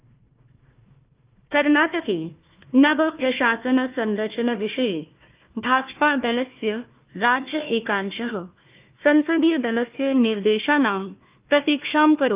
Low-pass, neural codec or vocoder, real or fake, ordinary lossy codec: 3.6 kHz; codec, 16 kHz, 1 kbps, FunCodec, trained on Chinese and English, 50 frames a second; fake; Opus, 24 kbps